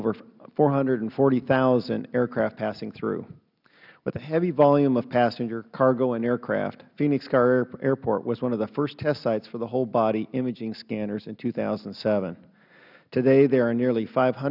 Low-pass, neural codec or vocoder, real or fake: 5.4 kHz; none; real